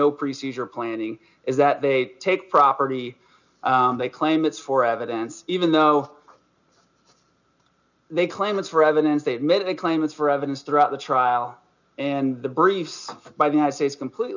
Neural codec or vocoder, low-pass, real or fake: none; 7.2 kHz; real